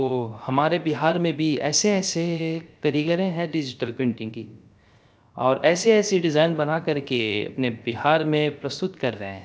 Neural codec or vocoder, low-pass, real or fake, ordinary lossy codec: codec, 16 kHz, 0.7 kbps, FocalCodec; none; fake; none